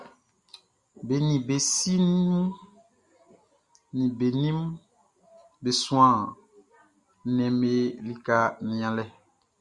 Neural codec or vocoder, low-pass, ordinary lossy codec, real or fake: none; 10.8 kHz; Opus, 64 kbps; real